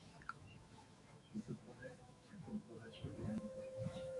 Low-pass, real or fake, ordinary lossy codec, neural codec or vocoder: 10.8 kHz; fake; AAC, 64 kbps; autoencoder, 48 kHz, 128 numbers a frame, DAC-VAE, trained on Japanese speech